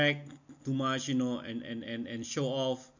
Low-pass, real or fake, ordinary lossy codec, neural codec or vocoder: 7.2 kHz; real; none; none